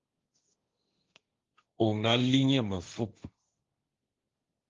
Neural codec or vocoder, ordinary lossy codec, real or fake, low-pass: codec, 16 kHz, 1.1 kbps, Voila-Tokenizer; Opus, 32 kbps; fake; 7.2 kHz